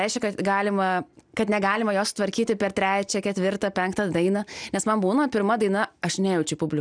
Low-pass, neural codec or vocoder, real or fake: 9.9 kHz; none; real